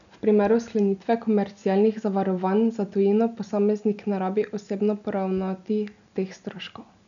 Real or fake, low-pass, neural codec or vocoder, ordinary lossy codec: real; 7.2 kHz; none; none